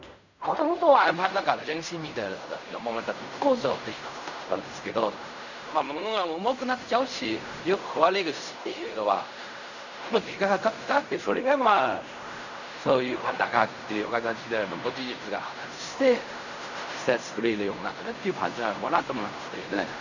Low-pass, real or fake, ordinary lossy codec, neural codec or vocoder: 7.2 kHz; fake; none; codec, 16 kHz in and 24 kHz out, 0.4 kbps, LongCat-Audio-Codec, fine tuned four codebook decoder